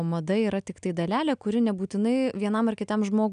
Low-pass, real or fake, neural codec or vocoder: 9.9 kHz; real; none